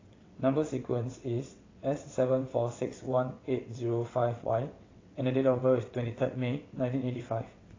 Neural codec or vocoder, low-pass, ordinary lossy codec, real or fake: vocoder, 22.05 kHz, 80 mel bands, WaveNeXt; 7.2 kHz; AAC, 32 kbps; fake